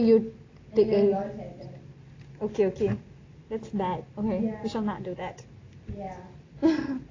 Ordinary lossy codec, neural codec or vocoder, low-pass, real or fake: AAC, 32 kbps; none; 7.2 kHz; real